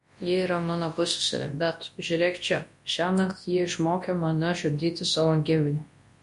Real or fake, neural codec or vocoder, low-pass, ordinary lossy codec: fake; codec, 24 kHz, 0.9 kbps, WavTokenizer, large speech release; 10.8 kHz; MP3, 48 kbps